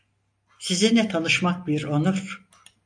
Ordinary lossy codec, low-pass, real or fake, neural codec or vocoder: AAC, 64 kbps; 9.9 kHz; real; none